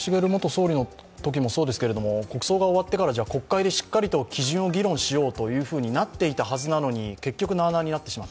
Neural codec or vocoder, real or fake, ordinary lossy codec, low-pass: none; real; none; none